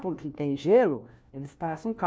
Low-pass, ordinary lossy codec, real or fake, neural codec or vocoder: none; none; fake; codec, 16 kHz, 1 kbps, FunCodec, trained on LibriTTS, 50 frames a second